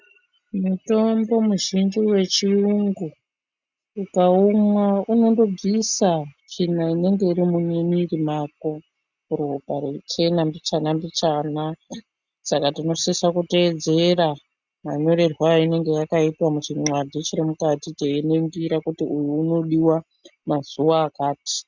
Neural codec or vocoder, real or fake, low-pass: none; real; 7.2 kHz